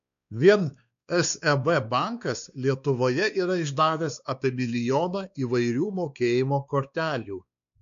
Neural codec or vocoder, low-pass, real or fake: codec, 16 kHz, 2 kbps, X-Codec, WavLM features, trained on Multilingual LibriSpeech; 7.2 kHz; fake